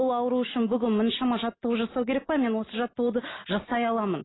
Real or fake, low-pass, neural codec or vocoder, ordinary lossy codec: real; 7.2 kHz; none; AAC, 16 kbps